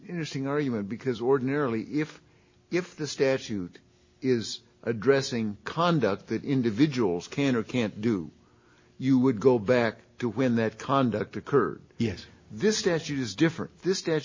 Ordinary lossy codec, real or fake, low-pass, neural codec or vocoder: MP3, 32 kbps; real; 7.2 kHz; none